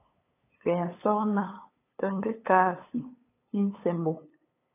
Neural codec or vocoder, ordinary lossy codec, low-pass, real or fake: codec, 16 kHz, 8 kbps, FunCodec, trained on LibriTTS, 25 frames a second; AAC, 24 kbps; 3.6 kHz; fake